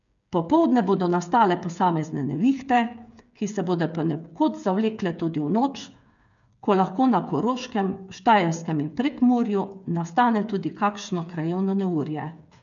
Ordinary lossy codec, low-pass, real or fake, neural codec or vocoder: none; 7.2 kHz; fake; codec, 16 kHz, 8 kbps, FreqCodec, smaller model